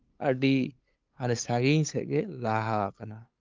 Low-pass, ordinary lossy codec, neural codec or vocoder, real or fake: 7.2 kHz; Opus, 32 kbps; codec, 16 kHz, 8 kbps, FunCodec, trained on LibriTTS, 25 frames a second; fake